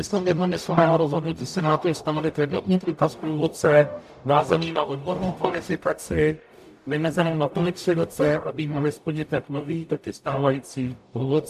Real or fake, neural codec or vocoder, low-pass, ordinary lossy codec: fake; codec, 44.1 kHz, 0.9 kbps, DAC; 14.4 kHz; AAC, 96 kbps